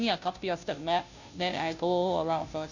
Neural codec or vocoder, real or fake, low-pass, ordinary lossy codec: codec, 16 kHz, 0.5 kbps, FunCodec, trained on Chinese and English, 25 frames a second; fake; 7.2 kHz; none